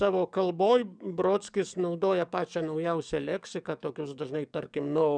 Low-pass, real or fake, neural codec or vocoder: 9.9 kHz; fake; codec, 44.1 kHz, 7.8 kbps, DAC